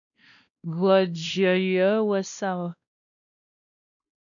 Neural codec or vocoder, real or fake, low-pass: codec, 16 kHz, 1 kbps, X-Codec, WavLM features, trained on Multilingual LibriSpeech; fake; 7.2 kHz